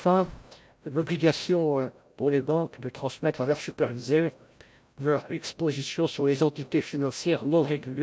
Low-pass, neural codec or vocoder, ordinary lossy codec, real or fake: none; codec, 16 kHz, 0.5 kbps, FreqCodec, larger model; none; fake